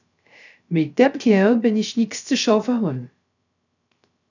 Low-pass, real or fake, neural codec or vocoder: 7.2 kHz; fake; codec, 16 kHz, 0.3 kbps, FocalCodec